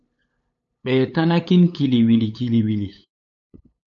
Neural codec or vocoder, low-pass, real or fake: codec, 16 kHz, 8 kbps, FunCodec, trained on LibriTTS, 25 frames a second; 7.2 kHz; fake